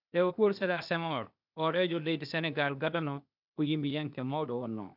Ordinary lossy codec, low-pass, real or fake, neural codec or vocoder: none; 5.4 kHz; fake; codec, 16 kHz, 0.8 kbps, ZipCodec